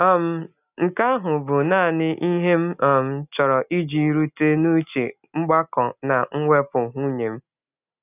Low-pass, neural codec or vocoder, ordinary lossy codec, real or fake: 3.6 kHz; none; none; real